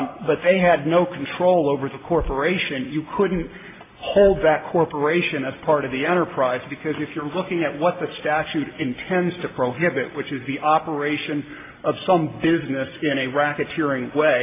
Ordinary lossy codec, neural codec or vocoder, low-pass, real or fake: AAC, 24 kbps; none; 3.6 kHz; real